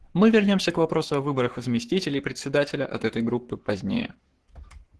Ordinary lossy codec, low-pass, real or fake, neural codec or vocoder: Opus, 16 kbps; 10.8 kHz; fake; codec, 44.1 kHz, 7.8 kbps, Pupu-Codec